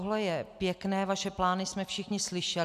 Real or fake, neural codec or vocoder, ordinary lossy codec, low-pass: real; none; AAC, 96 kbps; 14.4 kHz